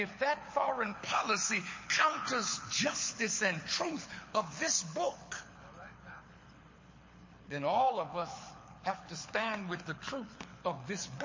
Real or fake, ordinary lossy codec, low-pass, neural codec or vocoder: fake; MP3, 32 kbps; 7.2 kHz; codec, 24 kHz, 6 kbps, HILCodec